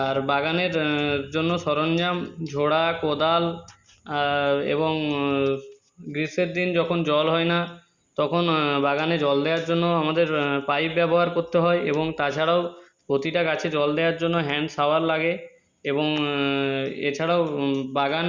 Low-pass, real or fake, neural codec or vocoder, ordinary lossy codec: 7.2 kHz; real; none; Opus, 64 kbps